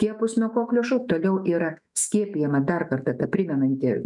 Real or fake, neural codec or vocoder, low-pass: fake; autoencoder, 48 kHz, 128 numbers a frame, DAC-VAE, trained on Japanese speech; 10.8 kHz